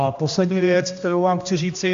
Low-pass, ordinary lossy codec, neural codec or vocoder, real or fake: 7.2 kHz; AAC, 64 kbps; codec, 16 kHz, 1 kbps, X-Codec, HuBERT features, trained on general audio; fake